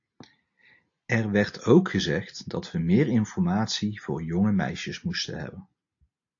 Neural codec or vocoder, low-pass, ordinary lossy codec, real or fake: none; 7.2 kHz; AAC, 48 kbps; real